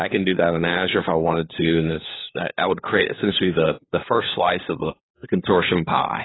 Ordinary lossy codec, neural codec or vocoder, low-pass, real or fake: AAC, 16 kbps; codec, 16 kHz, 4 kbps, FunCodec, trained on LibriTTS, 50 frames a second; 7.2 kHz; fake